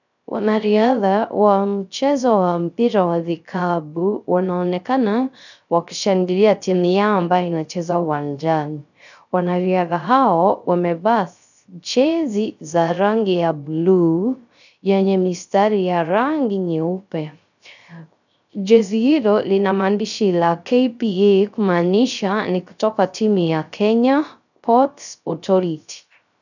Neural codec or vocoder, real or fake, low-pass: codec, 16 kHz, 0.3 kbps, FocalCodec; fake; 7.2 kHz